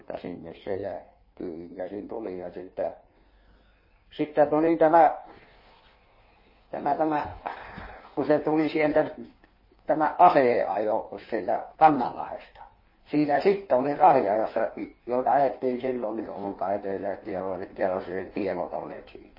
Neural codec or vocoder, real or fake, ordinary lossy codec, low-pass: codec, 16 kHz in and 24 kHz out, 1.1 kbps, FireRedTTS-2 codec; fake; MP3, 24 kbps; 5.4 kHz